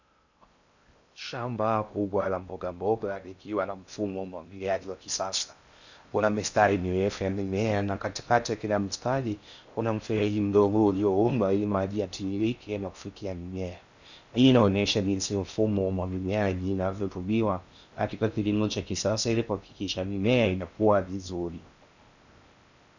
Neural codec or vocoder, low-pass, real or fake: codec, 16 kHz in and 24 kHz out, 0.6 kbps, FocalCodec, streaming, 2048 codes; 7.2 kHz; fake